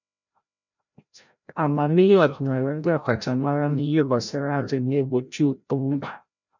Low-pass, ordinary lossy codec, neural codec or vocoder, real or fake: 7.2 kHz; none; codec, 16 kHz, 0.5 kbps, FreqCodec, larger model; fake